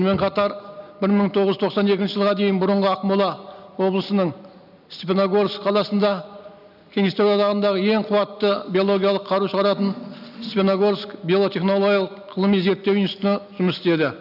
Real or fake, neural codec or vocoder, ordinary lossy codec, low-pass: real; none; none; 5.4 kHz